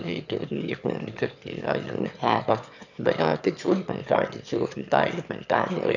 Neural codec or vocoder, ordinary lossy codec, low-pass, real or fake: autoencoder, 22.05 kHz, a latent of 192 numbers a frame, VITS, trained on one speaker; none; 7.2 kHz; fake